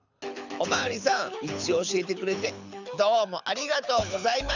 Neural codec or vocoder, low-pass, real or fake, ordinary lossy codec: codec, 24 kHz, 6 kbps, HILCodec; 7.2 kHz; fake; none